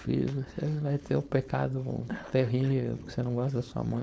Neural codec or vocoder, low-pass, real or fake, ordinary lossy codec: codec, 16 kHz, 4.8 kbps, FACodec; none; fake; none